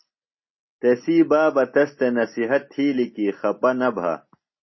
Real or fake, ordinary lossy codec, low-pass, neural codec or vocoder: real; MP3, 24 kbps; 7.2 kHz; none